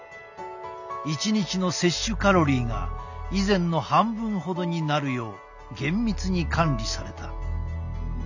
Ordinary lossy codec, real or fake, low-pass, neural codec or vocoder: none; real; 7.2 kHz; none